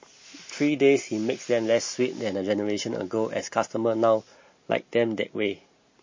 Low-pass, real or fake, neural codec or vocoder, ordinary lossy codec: 7.2 kHz; real; none; MP3, 32 kbps